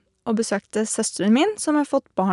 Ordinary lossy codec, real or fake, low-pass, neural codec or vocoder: none; real; 10.8 kHz; none